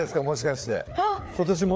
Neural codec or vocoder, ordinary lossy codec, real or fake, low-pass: codec, 16 kHz, 4 kbps, FreqCodec, larger model; none; fake; none